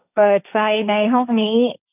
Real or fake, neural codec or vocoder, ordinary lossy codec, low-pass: fake; codec, 16 kHz, 1.1 kbps, Voila-Tokenizer; none; 3.6 kHz